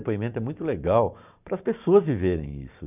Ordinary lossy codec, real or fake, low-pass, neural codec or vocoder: none; fake; 3.6 kHz; vocoder, 44.1 kHz, 128 mel bands every 512 samples, BigVGAN v2